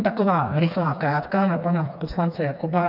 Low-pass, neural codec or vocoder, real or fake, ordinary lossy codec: 5.4 kHz; codec, 16 kHz, 2 kbps, FreqCodec, smaller model; fake; AAC, 48 kbps